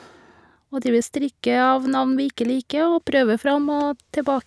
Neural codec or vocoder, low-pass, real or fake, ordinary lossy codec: none; none; real; none